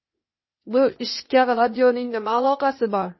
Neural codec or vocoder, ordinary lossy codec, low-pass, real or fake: codec, 16 kHz, 0.8 kbps, ZipCodec; MP3, 24 kbps; 7.2 kHz; fake